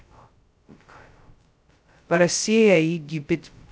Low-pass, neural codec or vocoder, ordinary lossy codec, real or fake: none; codec, 16 kHz, 0.2 kbps, FocalCodec; none; fake